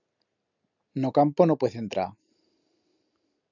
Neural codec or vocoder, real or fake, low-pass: none; real; 7.2 kHz